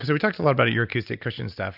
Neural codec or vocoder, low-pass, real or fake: none; 5.4 kHz; real